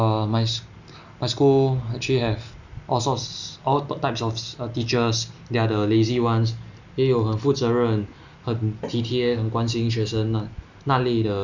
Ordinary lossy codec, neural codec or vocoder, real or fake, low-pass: none; none; real; 7.2 kHz